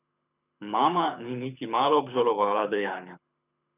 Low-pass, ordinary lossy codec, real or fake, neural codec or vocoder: 3.6 kHz; none; fake; codec, 24 kHz, 6 kbps, HILCodec